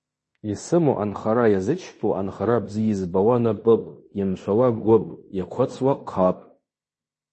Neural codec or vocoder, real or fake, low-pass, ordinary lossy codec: codec, 16 kHz in and 24 kHz out, 0.9 kbps, LongCat-Audio-Codec, fine tuned four codebook decoder; fake; 10.8 kHz; MP3, 32 kbps